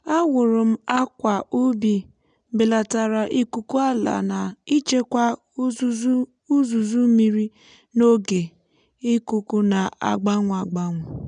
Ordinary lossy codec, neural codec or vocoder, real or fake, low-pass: none; none; real; 9.9 kHz